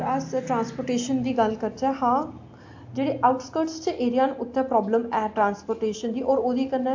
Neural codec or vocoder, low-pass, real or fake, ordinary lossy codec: none; 7.2 kHz; real; AAC, 48 kbps